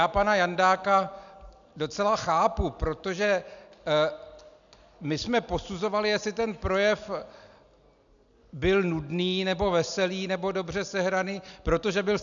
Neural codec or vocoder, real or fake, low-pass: none; real; 7.2 kHz